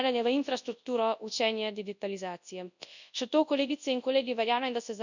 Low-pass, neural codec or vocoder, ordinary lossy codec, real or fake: 7.2 kHz; codec, 24 kHz, 0.9 kbps, WavTokenizer, large speech release; none; fake